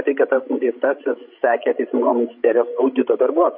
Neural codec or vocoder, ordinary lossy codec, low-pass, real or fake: codec, 16 kHz, 16 kbps, FreqCodec, larger model; MP3, 32 kbps; 5.4 kHz; fake